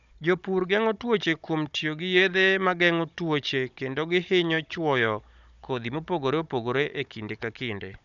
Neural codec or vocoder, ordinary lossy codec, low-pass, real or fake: codec, 16 kHz, 16 kbps, FunCodec, trained on Chinese and English, 50 frames a second; none; 7.2 kHz; fake